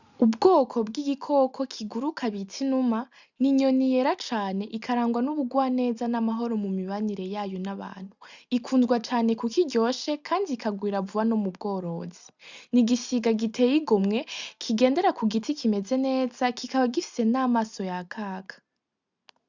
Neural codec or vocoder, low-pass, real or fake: none; 7.2 kHz; real